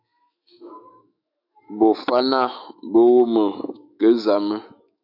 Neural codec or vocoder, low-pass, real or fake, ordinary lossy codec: autoencoder, 48 kHz, 128 numbers a frame, DAC-VAE, trained on Japanese speech; 5.4 kHz; fake; AAC, 48 kbps